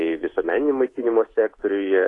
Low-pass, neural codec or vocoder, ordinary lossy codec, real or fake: 10.8 kHz; codec, 24 kHz, 3.1 kbps, DualCodec; AAC, 32 kbps; fake